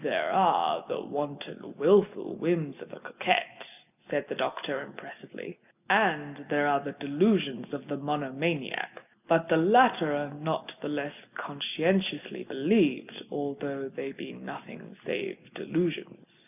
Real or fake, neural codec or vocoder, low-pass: real; none; 3.6 kHz